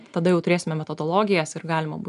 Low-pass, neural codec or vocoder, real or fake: 10.8 kHz; none; real